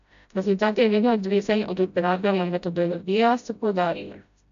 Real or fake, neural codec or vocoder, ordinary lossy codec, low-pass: fake; codec, 16 kHz, 0.5 kbps, FreqCodec, smaller model; AAC, 96 kbps; 7.2 kHz